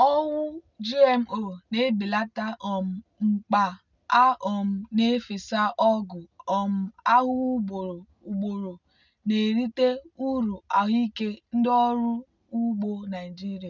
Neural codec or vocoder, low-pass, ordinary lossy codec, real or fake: none; 7.2 kHz; none; real